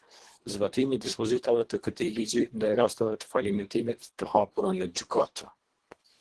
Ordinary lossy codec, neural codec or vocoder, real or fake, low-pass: Opus, 16 kbps; codec, 24 kHz, 1.5 kbps, HILCodec; fake; 10.8 kHz